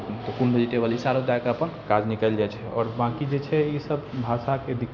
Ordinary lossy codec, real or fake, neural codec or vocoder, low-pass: none; real; none; 7.2 kHz